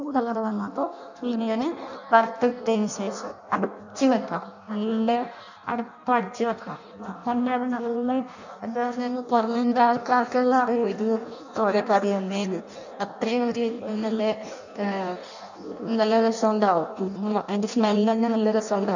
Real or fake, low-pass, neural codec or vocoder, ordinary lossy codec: fake; 7.2 kHz; codec, 16 kHz in and 24 kHz out, 0.6 kbps, FireRedTTS-2 codec; none